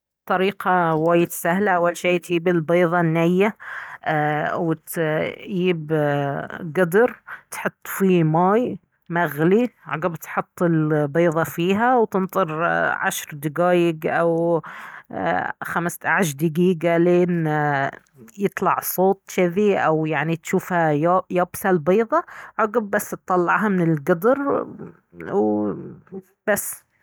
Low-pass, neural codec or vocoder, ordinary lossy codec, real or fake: none; none; none; real